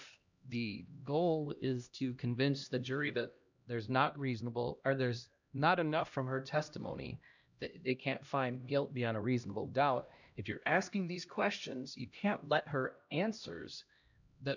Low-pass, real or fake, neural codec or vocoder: 7.2 kHz; fake; codec, 16 kHz, 1 kbps, X-Codec, HuBERT features, trained on LibriSpeech